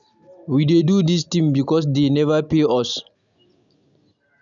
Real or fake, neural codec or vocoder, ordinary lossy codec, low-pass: real; none; none; 7.2 kHz